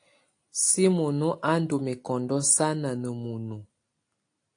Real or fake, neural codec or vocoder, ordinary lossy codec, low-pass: real; none; AAC, 48 kbps; 9.9 kHz